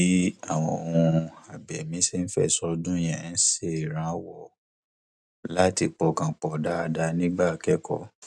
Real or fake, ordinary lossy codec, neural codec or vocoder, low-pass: real; none; none; none